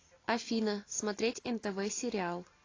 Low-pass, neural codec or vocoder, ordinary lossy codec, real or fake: 7.2 kHz; none; AAC, 32 kbps; real